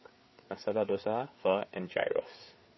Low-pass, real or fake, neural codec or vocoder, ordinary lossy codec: 7.2 kHz; fake; codec, 16 kHz, 4 kbps, FreqCodec, larger model; MP3, 24 kbps